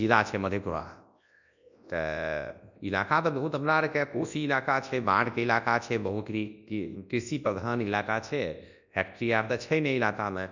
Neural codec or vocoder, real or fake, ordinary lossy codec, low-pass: codec, 24 kHz, 0.9 kbps, WavTokenizer, large speech release; fake; none; 7.2 kHz